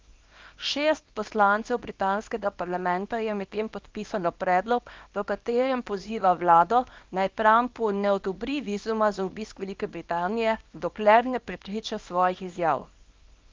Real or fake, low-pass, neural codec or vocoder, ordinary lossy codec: fake; 7.2 kHz; codec, 24 kHz, 0.9 kbps, WavTokenizer, small release; Opus, 24 kbps